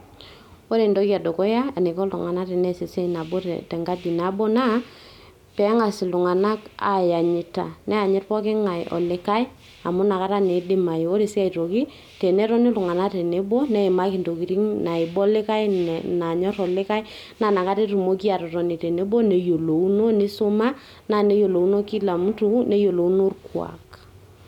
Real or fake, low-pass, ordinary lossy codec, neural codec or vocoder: real; 19.8 kHz; none; none